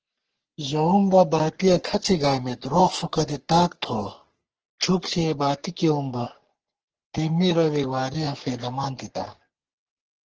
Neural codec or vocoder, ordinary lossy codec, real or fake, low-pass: codec, 44.1 kHz, 3.4 kbps, Pupu-Codec; Opus, 16 kbps; fake; 7.2 kHz